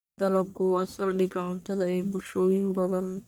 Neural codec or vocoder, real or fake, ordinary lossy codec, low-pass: codec, 44.1 kHz, 1.7 kbps, Pupu-Codec; fake; none; none